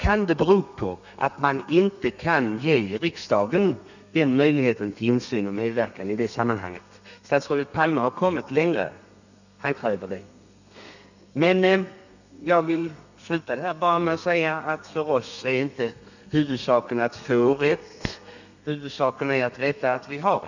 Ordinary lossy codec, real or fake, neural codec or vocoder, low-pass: none; fake; codec, 32 kHz, 1.9 kbps, SNAC; 7.2 kHz